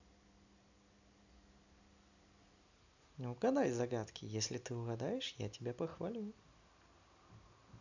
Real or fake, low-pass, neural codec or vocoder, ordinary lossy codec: real; 7.2 kHz; none; none